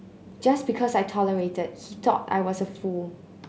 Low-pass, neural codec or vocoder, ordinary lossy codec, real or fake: none; none; none; real